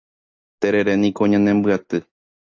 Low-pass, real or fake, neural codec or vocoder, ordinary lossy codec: 7.2 kHz; real; none; AAC, 48 kbps